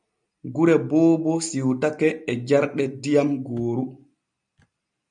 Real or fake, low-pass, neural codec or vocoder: real; 9.9 kHz; none